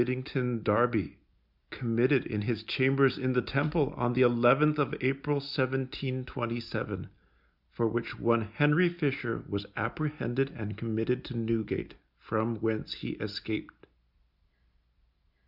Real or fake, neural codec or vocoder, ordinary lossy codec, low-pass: real; none; Opus, 64 kbps; 5.4 kHz